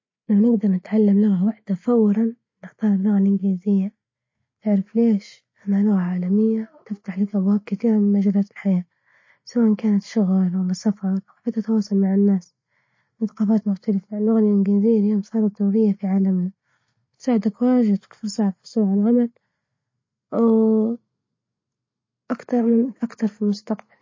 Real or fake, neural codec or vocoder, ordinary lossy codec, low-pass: real; none; MP3, 32 kbps; 7.2 kHz